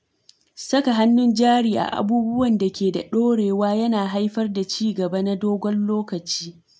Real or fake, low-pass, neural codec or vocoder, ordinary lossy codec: real; none; none; none